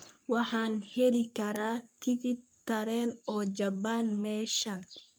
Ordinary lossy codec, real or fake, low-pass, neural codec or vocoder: none; fake; none; codec, 44.1 kHz, 3.4 kbps, Pupu-Codec